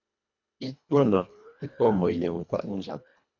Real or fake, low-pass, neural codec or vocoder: fake; 7.2 kHz; codec, 24 kHz, 1.5 kbps, HILCodec